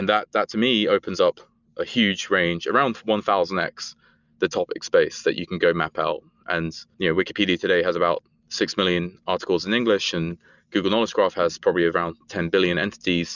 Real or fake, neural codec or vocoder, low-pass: real; none; 7.2 kHz